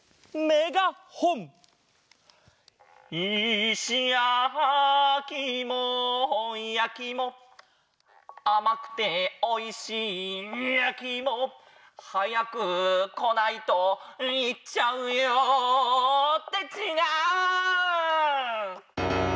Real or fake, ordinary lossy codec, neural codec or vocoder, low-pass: real; none; none; none